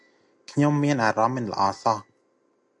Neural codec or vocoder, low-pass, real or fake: none; 10.8 kHz; real